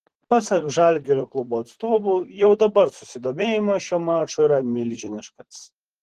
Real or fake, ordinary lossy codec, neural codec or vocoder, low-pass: fake; Opus, 16 kbps; vocoder, 44.1 kHz, 128 mel bands, Pupu-Vocoder; 14.4 kHz